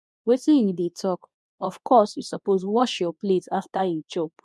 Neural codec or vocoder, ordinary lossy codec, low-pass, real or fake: codec, 24 kHz, 0.9 kbps, WavTokenizer, medium speech release version 2; none; none; fake